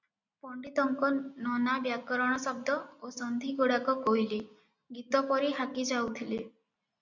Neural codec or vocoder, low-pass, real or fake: none; 7.2 kHz; real